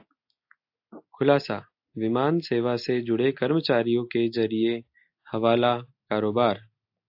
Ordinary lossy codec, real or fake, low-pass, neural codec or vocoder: AAC, 48 kbps; real; 5.4 kHz; none